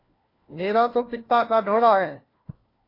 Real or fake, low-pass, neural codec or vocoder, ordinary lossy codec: fake; 5.4 kHz; codec, 16 kHz, 1 kbps, FunCodec, trained on LibriTTS, 50 frames a second; AAC, 24 kbps